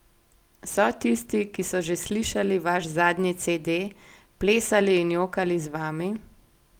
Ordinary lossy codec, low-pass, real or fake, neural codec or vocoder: Opus, 24 kbps; 19.8 kHz; real; none